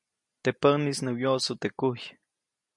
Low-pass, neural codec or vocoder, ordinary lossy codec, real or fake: 10.8 kHz; none; MP3, 48 kbps; real